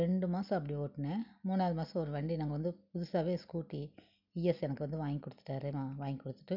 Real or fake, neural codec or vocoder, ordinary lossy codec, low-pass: real; none; none; 5.4 kHz